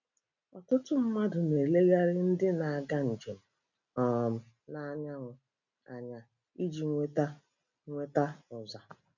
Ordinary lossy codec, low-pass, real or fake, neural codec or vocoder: none; 7.2 kHz; real; none